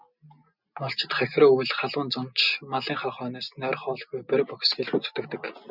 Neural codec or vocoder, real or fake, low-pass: none; real; 5.4 kHz